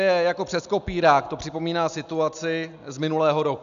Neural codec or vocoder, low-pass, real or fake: none; 7.2 kHz; real